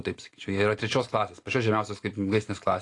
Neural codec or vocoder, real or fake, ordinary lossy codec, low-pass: none; real; AAC, 48 kbps; 10.8 kHz